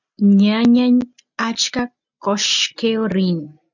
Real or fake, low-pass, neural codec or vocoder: real; 7.2 kHz; none